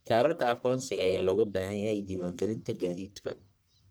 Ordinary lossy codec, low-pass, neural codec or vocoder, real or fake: none; none; codec, 44.1 kHz, 1.7 kbps, Pupu-Codec; fake